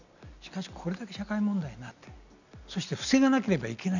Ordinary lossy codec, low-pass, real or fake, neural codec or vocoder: none; 7.2 kHz; real; none